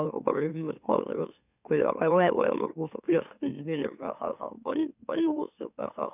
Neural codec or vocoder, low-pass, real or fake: autoencoder, 44.1 kHz, a latent of 192 numbers a frame, MeloTTS; 3.6 kHz; fake